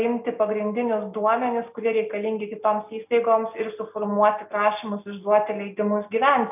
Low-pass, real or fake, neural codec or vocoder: 3.6 kHz; real; none